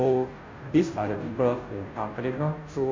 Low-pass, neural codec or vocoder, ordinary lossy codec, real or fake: 7.2 kHz; codec, 16 kHz, 0.5 kbps, FunCodec, trained on Chinese and English, 25 frames a second; MP3, 48 kbps; fake